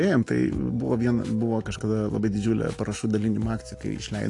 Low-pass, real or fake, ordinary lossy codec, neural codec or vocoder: 10.8 kHz; real; AAC, 48 kbps; none